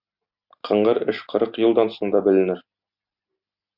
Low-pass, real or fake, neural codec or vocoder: 5.4 kHz; real; none